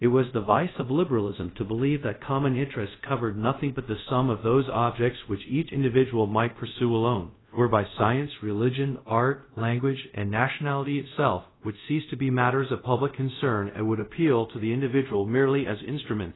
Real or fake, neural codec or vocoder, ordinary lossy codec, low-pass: fake; codec, 24 kHz, 0.5 kbps, DualCodec; AAC, 16 kbps; 7.2 kHz